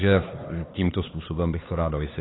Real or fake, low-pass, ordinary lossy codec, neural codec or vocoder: fake; 7.2 kHz; AAC, 16 kbps; codec, 16 kHz, 4 kbps, X-Codec, HuBERT features, trained on LibriSpeech